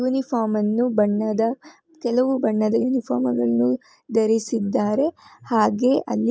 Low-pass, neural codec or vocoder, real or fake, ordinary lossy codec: none; none; real; none